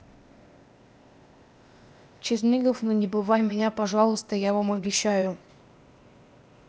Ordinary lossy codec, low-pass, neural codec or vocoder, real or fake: none; none; codec, 16 kHz, 0.8 kbps, ZipCodec; fake